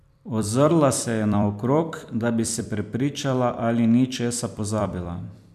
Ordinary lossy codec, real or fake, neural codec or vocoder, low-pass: none; fake; vocoder, 44.1 kHz, 128 mel bands every 256 samples, BigVGAN v2; 14.4 kHz